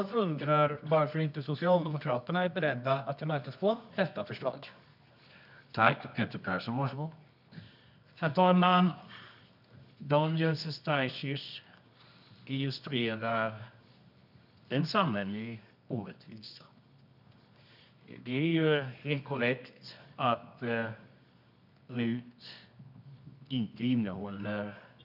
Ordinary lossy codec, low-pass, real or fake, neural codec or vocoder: none; 5.4 kHz; fake; codec, 24 kHz, 0.9 kbps, WavTokenizer, medium music audio release